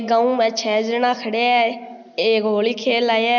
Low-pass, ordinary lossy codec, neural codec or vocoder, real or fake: 7.2 kHz; none; none; real